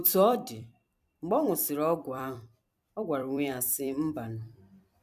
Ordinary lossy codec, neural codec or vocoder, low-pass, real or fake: none; vocoder, 44.1 kHz, 128 mel bands every 256 samples, BigVGAN v2; 14.4 kHz; fake